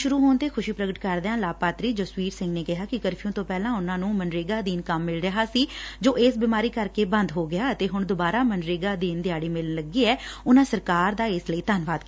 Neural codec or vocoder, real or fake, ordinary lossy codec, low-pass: none; real; none; none